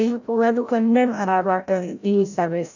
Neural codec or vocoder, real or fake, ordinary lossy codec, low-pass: codec, 16 kHz, 0.5 kbps, FreqCodec, larger model; fake; none; 7.2 kHz